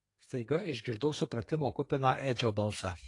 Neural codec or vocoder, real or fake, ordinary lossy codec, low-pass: codec, 32 kHz, 1.9 kbps, SNAC; fake; AAC, 48 kbps; 10.8 kHz